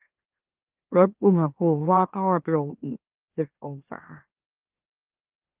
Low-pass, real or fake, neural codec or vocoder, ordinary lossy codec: 3.6 kHz; fake; autoencoder, 44.1 kHz, a latent of 192 numbers a frame, MeloTTS; Opus, 32 kbps